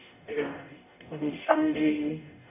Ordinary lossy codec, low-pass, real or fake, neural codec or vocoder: none; 3.6 kHz; fake; codec, 44.1 kHz, 0.9 kbps, DAC